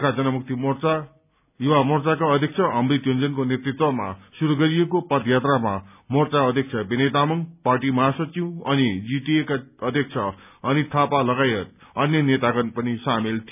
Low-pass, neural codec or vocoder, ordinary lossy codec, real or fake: 3.6 kHz; none; none; real